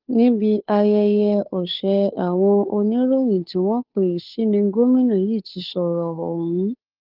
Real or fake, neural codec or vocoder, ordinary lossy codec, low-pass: fake; codec, 16 kHz, 2 kbps, FunCodec, trained on Chinese and English, 25 frames a second; Opus, 32 kbps; 5.4 kHz